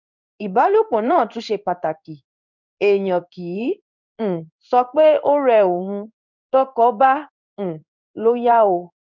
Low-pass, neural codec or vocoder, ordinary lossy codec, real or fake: 7.2 kHz; codec, 16 kHz in and 24 kHz out, 1 kbps, XY-Tokenizer; none; fake